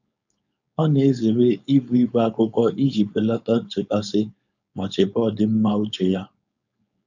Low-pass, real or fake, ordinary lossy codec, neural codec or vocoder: 7.2 kHz; fake; none; codec, 16 kHz, 4.8 kbps, FACodec